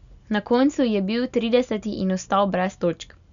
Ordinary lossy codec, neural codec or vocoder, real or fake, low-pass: none; none; real; 7.2 kHz